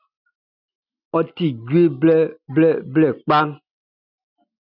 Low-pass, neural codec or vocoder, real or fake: 5.4 kHz; none; real